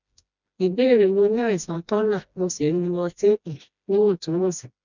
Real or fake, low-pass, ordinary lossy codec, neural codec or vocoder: fake; 7.2 kHz; none; codec, 16 kHz, 1 kbps, FreqCodec, smaller model